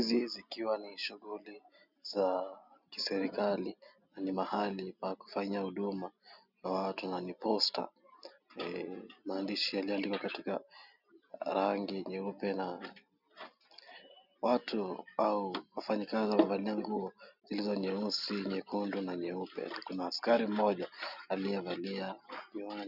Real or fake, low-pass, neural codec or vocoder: real; 5.4 kHz; none